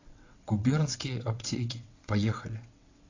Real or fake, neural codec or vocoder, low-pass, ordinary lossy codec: fake; vocoder, 22.05 kHz, 80 mel bands, WaveNeXt; 7.2 kHz; AAC, 48 kbps